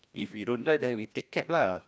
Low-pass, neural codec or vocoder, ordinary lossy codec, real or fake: none; codec, 16 kHz, 1 kbps, FreqCodec, larger model; none; fake